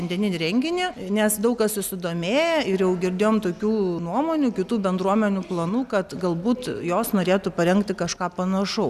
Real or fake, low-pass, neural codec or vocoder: real; 14.4 kHz; none